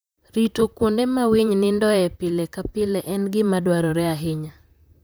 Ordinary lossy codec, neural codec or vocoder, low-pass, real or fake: none; vocoder, 44.1 kHz, 128 mel bands, Pupu-Vocoder; none; fake